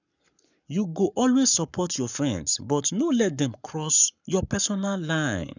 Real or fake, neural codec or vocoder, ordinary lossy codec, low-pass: fake; codec, 44.1 kHz, 7.8 kbps, Pupu-Codec; none; 7.2 kHz